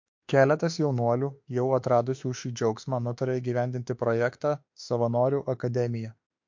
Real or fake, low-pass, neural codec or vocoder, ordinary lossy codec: fake; 7.2 kHz; autoencoder, 48 kHz, 32 numbers a frame, DAC-VAE, trained on Japanese speech; MP3, 48 kbps